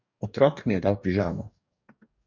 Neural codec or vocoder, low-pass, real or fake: codec, 44.1 kHz, 2.6 kbps, DAC; 7.2 kHz; fake